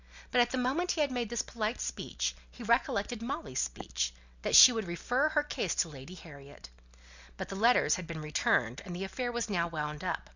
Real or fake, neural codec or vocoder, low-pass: real; none; 7.2 kHz